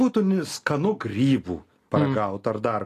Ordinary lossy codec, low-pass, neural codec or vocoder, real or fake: AAC, 48 kbps; 14.4 kHz; none; real